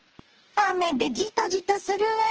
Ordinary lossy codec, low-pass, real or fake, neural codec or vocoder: Opus, 16 kbps; 7.2 kHz; fake; codec, 44.1 kHz, 2.6 kbps, DAC